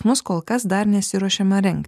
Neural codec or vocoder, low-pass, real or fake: none; 14.4 kHz; real